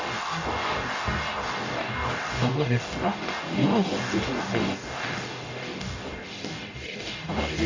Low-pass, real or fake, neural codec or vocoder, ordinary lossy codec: 7.2 kHz; fake; codec, 44.1 kHz, 0.9 kbps, DAC; AAC, 48 kbps